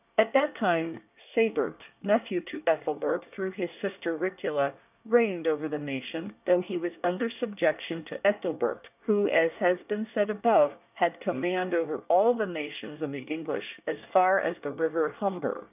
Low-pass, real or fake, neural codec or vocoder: 3.6 kHz; fake; codec, 24 kHz, 1 kbps, SNAC